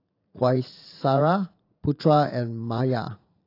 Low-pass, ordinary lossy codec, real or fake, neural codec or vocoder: 5.4 kHz; AAC, 32 kbps; fake; vocoder, 44.1 kHz, 80 mel bands, Vocos